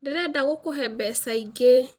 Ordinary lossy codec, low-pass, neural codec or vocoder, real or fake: Opus, 24 kbps; 19.8 kHz; vocoder, 44.1 kHz, 128 mel bands every 256 samples, BigVGAN v2; fake